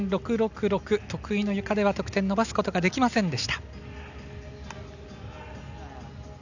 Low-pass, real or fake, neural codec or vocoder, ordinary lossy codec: 7.2 kHz; real; none; none